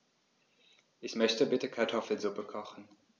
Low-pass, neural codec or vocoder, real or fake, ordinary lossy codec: none; none; real; none